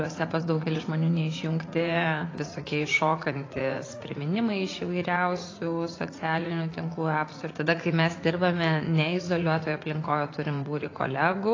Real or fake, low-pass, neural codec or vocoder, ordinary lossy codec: fake; 7.2 kHz; vocoder, 44.1 kHz, 80 mel bands, Vocos; AAC, 32 kbps